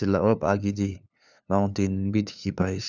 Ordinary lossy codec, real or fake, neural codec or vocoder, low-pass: none; fake; codec, 16 kHz, 2 kbps, FunCodec, trained on LibriTTS, 25 frames a second; 7.2 kHz